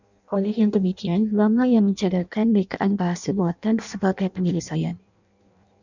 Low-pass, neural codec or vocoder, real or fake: 7.2 kHz; codec, 16 kHz in and 24 kHz out, 0.6 kbps, FireRedTTS-2 codec; fake